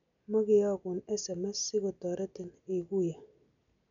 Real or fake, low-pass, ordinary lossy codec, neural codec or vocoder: real; 7.2 kHz; none; none